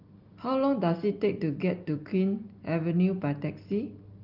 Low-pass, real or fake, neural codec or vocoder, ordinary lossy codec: 5.4 kHz; real; none; Opus, 32 kbps